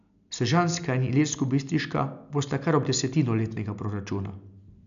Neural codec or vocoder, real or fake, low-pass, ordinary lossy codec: none; real; 7.2 kHz; none